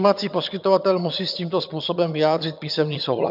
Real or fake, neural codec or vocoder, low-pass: fake; vocoder, 22.05 kHz, 80 mel bands, HiFi-GAN; 5.4 kHz